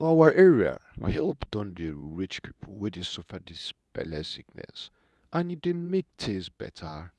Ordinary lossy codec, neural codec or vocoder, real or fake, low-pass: none; codec, 24 kHz, 0.9 kbps, WavTokenizer, medium speech release version 2; fake; none